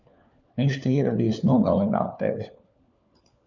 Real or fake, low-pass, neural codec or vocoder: fake; 7.2 kHz; codec, 16 kHz, 4 kbps, FunCodec, trained on LibriTTS, 50 frames a second